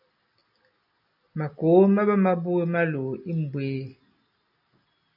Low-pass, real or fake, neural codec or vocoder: 5.4 kHz; real; none